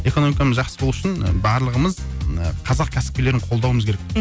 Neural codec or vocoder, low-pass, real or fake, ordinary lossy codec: none; none; real; none